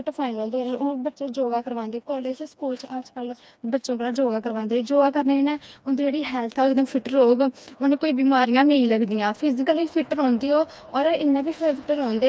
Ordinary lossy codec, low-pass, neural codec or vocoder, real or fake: none; none; codec, 16 kHz, 2 kbps, FreqCodec, smaller model; fake